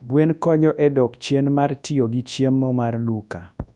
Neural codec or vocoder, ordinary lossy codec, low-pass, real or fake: codec, 24 kHz, 0.9 kbps, WavTokenizer, large speech release; none; 10.8 kHz; fake